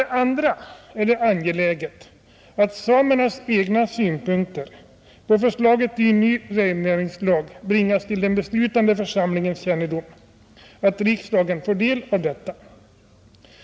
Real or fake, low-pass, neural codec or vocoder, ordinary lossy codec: real; none; none; none